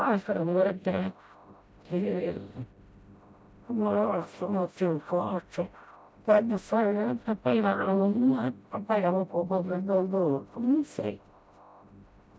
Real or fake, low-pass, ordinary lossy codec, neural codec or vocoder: fake; none; none; codec, 16 kHz, 0.5 kbps, FreqCodec, smaller model